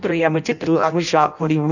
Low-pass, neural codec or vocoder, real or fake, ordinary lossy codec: 7.2 kHz; codec, 16 kHz in and 24 kHz out, 0.6 kbps, FireRedTTS-2 codec; fake; none